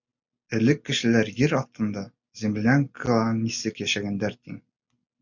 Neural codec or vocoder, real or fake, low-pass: none; real; 7.2 kHz